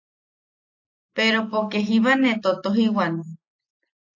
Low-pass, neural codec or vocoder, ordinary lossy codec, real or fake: 7.2 kHz; none; AAC, 48 kbps; real